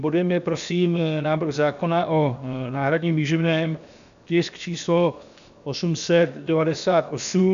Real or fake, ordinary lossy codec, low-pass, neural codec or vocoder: fake; AAC, 96 kbps; 7.2 kHz; codec, 16 kHz, 0.7 kbps, FocalCodec